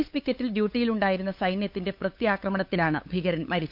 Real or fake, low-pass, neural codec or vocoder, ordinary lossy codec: fake; 5.4 kHz; codec, 16 kHz, 8 kbps, FunCodec, trained on LibriTTS, 25 frames a second; none